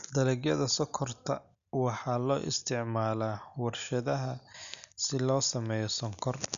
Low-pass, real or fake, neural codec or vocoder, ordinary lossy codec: 7.2 kHz; real; none; none